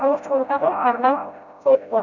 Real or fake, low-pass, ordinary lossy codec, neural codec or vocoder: fake; 7.2 kHz; none; codec, 16 kHz, 0.5 kbps, FreqCodec, smaller model